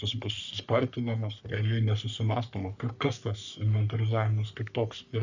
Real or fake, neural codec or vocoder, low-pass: fake; codec, 44.1 kHz, 3.4 kbps, Pupu-Codec; 7.2 kHz